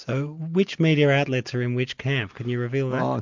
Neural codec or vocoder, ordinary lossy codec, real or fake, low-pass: none; MP3, 64 kbps; real; 7.2 kHz